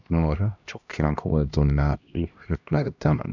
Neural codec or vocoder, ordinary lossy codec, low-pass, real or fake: codec, 16 kHz, 1 kbps, X-Codec, HuBERT features, trained on LibriSpeech; none; 7.2 kHz; fake